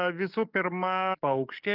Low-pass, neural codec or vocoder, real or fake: 5.4 kHz; codec, 44.1 kHz, 7.8 kbps, DAC; fake